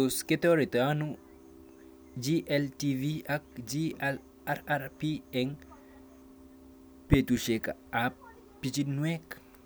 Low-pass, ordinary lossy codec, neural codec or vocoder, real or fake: none; none; none; real